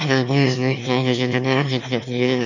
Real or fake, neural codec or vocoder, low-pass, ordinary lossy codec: fake; autoencoder, 22.05 kHz, a latent of 192 numbers a frame, VITS, trained on one speaker; 7.2 kHz; none